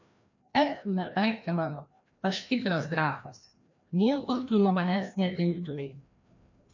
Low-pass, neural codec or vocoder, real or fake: 7.2 kHz; codec, 16 kHz, 1 kbps, FreqCodec, larger model; fake